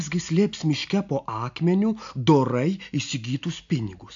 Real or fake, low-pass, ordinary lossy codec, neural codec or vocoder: real; 7.2 kHz; AAC, 64 kbps; none